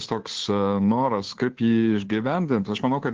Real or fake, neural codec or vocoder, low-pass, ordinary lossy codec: fake; codec, 16 kHz, 2 kbps, FunCodec, trained on Chinese and English, 25 frames a second; 7.2 kHz; Opus, 16 kbps